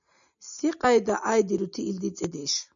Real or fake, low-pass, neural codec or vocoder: real; 7.2 kHz; none